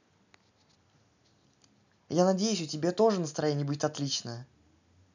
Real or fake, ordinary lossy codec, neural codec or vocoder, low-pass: real; none; none; 7.2 kHz